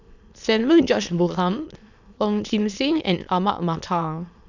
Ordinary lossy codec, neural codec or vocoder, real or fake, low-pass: none; autoencoder, 22.05 kHz, a latent of 192 numbers a frame, VITS, trained on many speakers; fake; 7.2 kHz